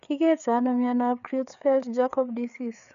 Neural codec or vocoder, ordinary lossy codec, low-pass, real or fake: codec, 16 kHz, 4 kbps, FreqCodec, larger model; none; 7.2 kHz; fake